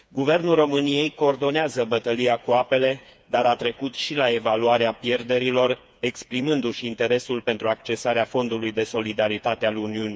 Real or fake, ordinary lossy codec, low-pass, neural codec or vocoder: fake; none; none; codec, 16 kHz, 4 kbps, FreqCodec, smaller model